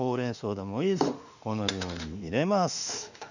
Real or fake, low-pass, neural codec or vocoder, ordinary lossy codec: fake; 7.2 kHz; autoencoder, 48 kHz, 32 numbers a frame, DAC-VAE, trained on Japanese speech; none